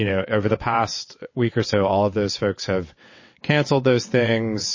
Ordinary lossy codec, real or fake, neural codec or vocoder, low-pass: MP3, 32 kbps; fake; vocoder, 22.05 kHz, 80 mel bands, WaveNeXt; 7.2 kHz